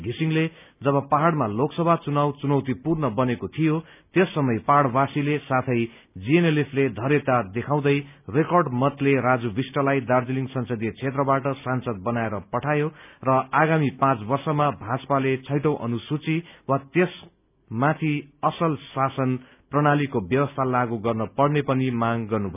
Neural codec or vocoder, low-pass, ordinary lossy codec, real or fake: none; 3.6 kHz; none; real